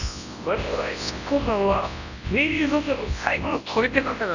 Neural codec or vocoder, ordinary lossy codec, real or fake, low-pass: codec, 24 kHz, 0.9 kbps, WavTokenizer, large speech release; none; fake; 7.2 kHz